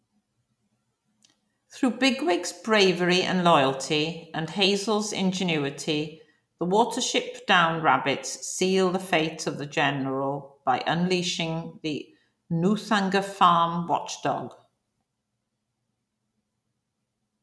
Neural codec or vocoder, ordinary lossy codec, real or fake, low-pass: none; none; real; none